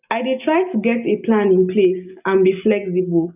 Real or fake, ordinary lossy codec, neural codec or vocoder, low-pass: real; none; none; 3.6 kHz